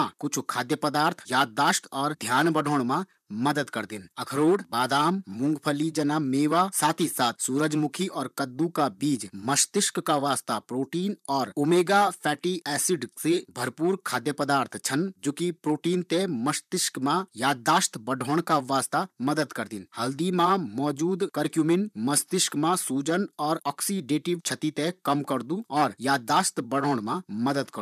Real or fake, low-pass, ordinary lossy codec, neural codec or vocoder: fake; 14.4 kHz; none; vocoder, 44.1 kHz, 128 mel bands, Pupu-Vocoder